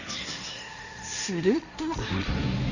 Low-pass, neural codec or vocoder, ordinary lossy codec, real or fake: 7.2 kHz; codec, 16 kHz, 1.1 kbps, Voila-Tokenizer; none; fake